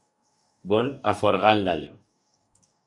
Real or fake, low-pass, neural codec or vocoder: fake; 10.8 kHz; codec, 44.1 kHz, 2.6 kbps, DAC